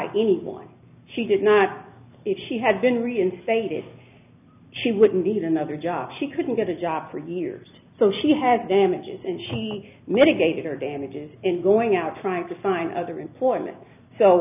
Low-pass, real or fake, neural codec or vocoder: 3.6 kHz; real; none